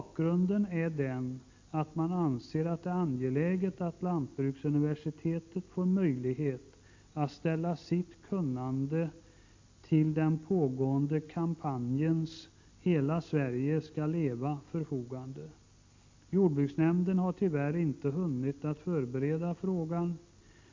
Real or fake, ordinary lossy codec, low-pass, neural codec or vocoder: real; MP3, 48 kbps; 7.2 kHz; none